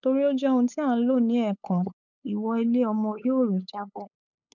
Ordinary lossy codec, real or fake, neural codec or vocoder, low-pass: none; fake; codec, 16 kHz, 8 kbps, FunCodec, trained on LibriTTS, 25 frames a second; 7.2 kHz